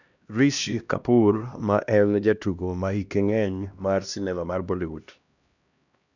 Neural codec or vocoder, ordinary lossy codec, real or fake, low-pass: codec, 16 kHz, 1 kbps, X-Codec, HuBERT features, trained on LibriSpeech; none; fake; 7.2 kHz